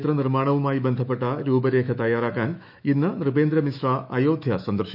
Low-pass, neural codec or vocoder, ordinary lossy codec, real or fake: 5.4 kHz; autoencoder, 48 kHz, 128 numbers a frame, DAC-VAE, trained on Japanese speech; none; fake